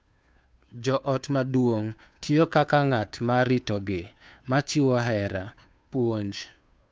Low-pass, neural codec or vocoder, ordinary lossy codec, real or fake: none; codec, 16 kHz, 2 kbps, FunCodec, trained on Chinese and English, 25 frames a second; none; fake